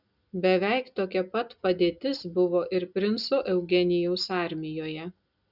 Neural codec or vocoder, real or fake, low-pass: none; real; 5.4 kHz